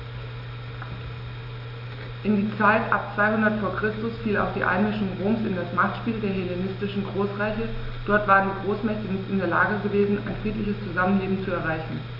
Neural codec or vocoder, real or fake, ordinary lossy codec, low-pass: none; real; none; 5.4 kHz